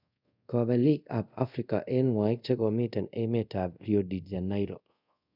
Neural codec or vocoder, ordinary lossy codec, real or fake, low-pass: codec, 24 kHz, 0.5 kbps, DualCodec; none; fake; 5.4 kHz